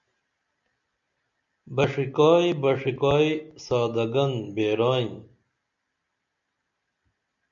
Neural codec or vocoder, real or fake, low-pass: none; real; 7.2 kHz